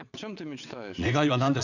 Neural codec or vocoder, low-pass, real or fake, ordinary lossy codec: vocoder, 22.05 kHz, 80 mel bands, WaveNeXt; 7.2 kHz; fake; none